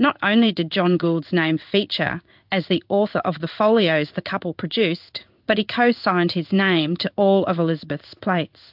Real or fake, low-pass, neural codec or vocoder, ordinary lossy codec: fake; 5.4 kHz; codec, 16 kHz in and 24 kHz out, 1 kbps, XY-Tokenizer; AAC, 48 kbps